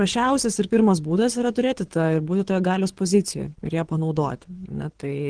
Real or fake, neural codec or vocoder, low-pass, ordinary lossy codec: fake; codec, 24 kHz, 6 kbps, HILCodec; 9.9 kHz; Opus, 16 kbps